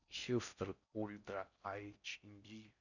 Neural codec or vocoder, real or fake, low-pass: codec, 16 kHz in and 24 kHz out, 0.6 kbps, FocalCodec, streaming, 4096 codes; fake; 7.2 kHz